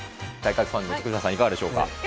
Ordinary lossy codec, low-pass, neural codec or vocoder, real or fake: none; none; none; real